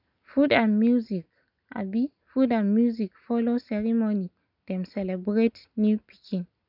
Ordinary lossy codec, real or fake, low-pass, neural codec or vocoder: none; real; 5.4 kHz; none